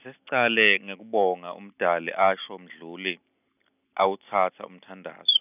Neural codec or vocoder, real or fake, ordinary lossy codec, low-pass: none; real; none; 3.6 kHz